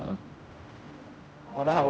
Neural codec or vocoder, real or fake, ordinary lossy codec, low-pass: codec, 16 kHz, 0.5 kbps, X-Codec, HuBERT features, trained on general audio; fake; none; none